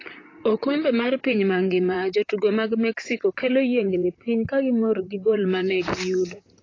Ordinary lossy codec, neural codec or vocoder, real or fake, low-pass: AAC, 32 kbps; vocoder, 44.1 kHz, 128 mel bands, Pupu-Vocoder; fake; 7.2 kHz